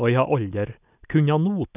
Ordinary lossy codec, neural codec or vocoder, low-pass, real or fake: none; none; 3.6 kHz; real